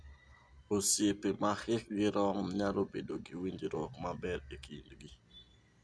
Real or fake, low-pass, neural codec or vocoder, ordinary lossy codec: real; none; none; none